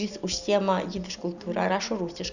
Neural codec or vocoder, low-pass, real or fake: none; 7.2 kHz; real